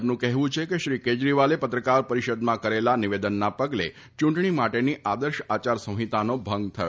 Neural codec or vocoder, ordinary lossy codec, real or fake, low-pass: none; none; real; none